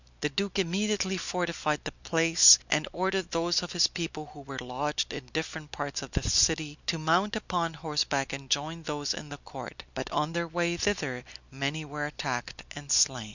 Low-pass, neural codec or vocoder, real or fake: 7.2 kHz; none; real